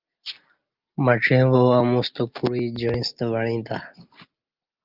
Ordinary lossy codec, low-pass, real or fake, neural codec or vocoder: Opus, 24 kbps; 5.4 kHz; real; none